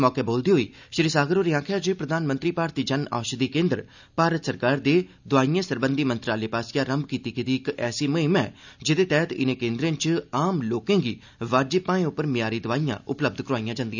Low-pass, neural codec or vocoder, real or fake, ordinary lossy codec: 7.2 kHz; none; real; none